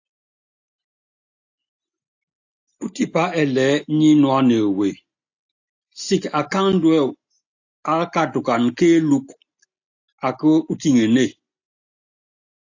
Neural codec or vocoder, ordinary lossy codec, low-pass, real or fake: none; AAC, 48 kbps; 7.2 kHz; real